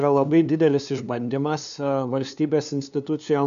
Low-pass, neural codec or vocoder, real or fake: 7.2 kHz; codec, 16 kHz, 2 kbps, FunCodec, trained on LibriTTS, 25 frames a second; fake